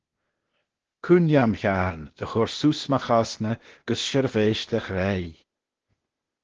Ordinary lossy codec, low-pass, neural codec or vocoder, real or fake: Opus, 16 kbps; 7.2 kHz; codec, 16 kHz, 0.8 kbps, ZipCodec; fake